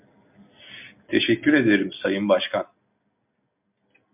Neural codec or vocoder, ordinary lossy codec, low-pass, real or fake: none; MP3, 32 kbps; 3.6 kHz; real